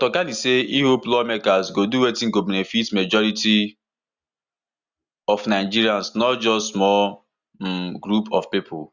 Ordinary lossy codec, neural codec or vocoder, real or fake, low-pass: Opus, 64 kbps; none; real; 7.2 kHz